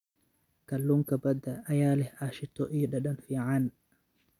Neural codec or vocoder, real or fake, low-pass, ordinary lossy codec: none; real; 19.8 kHz; none